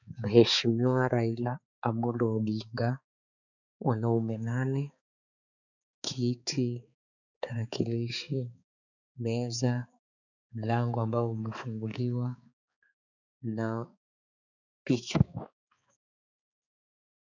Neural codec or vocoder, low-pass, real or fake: codec, 16 kHz, 4 kbps, X-Codec, HuBERT features, trained on balanced general audio; 7.2 kHz; fake